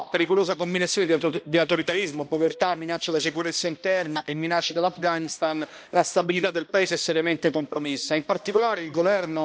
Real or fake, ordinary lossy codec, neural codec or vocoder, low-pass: fake; none; codec, 16 kHz, 1 kbps, X-Codec, HuBERT features, trained on balanced general audio; none